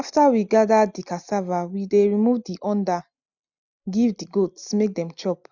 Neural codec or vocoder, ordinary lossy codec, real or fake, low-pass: none; none; real; 7.2 kHz